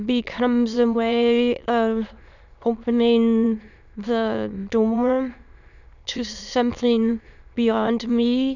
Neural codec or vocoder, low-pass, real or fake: autoencoder, 22.05 kHz, a latent of 192 numbers a frame, VITS, trained on many speakers; 7.2 kHz; fake